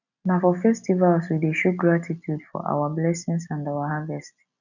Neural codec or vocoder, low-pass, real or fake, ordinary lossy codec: none; 7.2 kHz; real; none